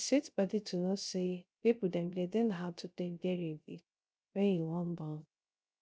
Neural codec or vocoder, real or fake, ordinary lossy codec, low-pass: codec, 16 kHz, 0.3 kbps, FocalCodec; fake; none; none